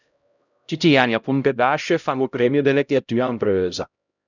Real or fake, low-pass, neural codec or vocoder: fake; 7.2 kHz; codec, 16 kHz, 0.5 kbps, X-Codec, HuBERT features, trained on LibriSpeech